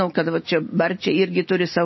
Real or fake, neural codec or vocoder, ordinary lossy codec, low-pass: real; none; MP3, 24 kbps; 7.2 kHz